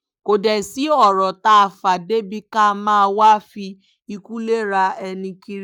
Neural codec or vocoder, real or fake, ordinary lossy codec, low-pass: codec, 44.1 kHz, 7.8 kbps, Pupu-Codec; fake; none; 19.8 kHz